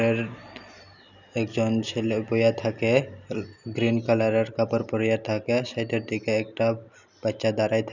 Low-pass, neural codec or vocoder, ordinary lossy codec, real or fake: 7.2 kHz; none; none; real